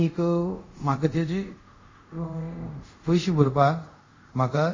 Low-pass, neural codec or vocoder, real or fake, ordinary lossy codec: 7.2 kHz; codec, 24 kHz, 0.5 kbps, DualCodec; fake; MP3, 32 kbps